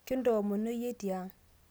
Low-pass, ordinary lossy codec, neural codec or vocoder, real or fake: none; none; none; real